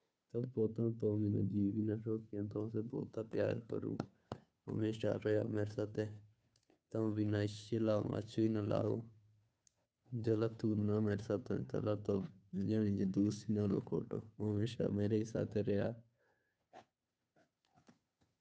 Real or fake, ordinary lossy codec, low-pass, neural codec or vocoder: fake; none; none; codec, 16 kHz, 2 kbps, FunCodec, trained on Chinese and English, 25 frames a second